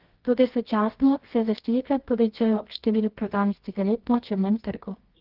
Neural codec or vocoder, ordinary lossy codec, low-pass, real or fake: codec, 24 kHz, 0.9 kbps, WavTokenizer, medium music audio release; Opus, 16 kbps; 5.4 kHz; fake